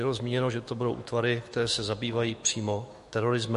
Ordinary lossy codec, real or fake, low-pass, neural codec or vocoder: MP3, 48 kbps; fake; 14.4 kHz; autoencoder, 48 kHz, 128 numbers a frame, DAC-VAE, trained on Japanese speech